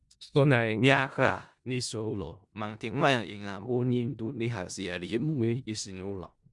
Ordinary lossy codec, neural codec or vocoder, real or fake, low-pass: Opus, 64 kbps; codec, 16 kHz in and 24 kHz out, 0.4 kbps, LongCat-Audio-Codec, four codebook decoder; fake; 10.8 kHz